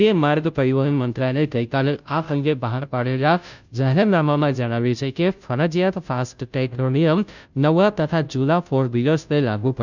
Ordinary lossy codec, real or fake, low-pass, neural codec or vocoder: none; fake; 7.2 kHz; codec, 16 kHz, 0.5 kbps, FunCodec, trained on Chinese and English, 25 frames a second